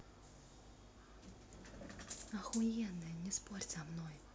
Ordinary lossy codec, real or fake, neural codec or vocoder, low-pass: none; real; none; none